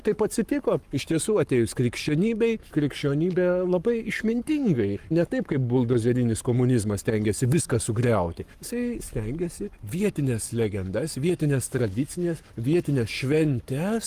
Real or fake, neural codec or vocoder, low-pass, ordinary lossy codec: fake; codec, 44.1 kHz, 7.8 kbps, Pupu-Codec; 14.4 kHz; Opus, 32 kbps